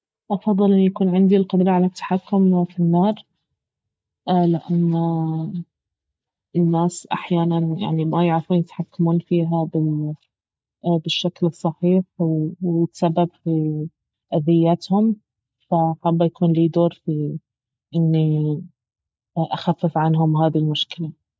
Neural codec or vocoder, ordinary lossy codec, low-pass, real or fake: none; none; none; real